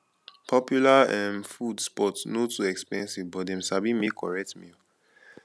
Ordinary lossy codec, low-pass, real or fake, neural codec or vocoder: none; none; real; none